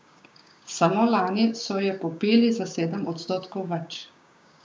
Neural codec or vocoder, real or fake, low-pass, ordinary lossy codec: codec, 16 kHz, 6 kbps, DAC; fake; none; none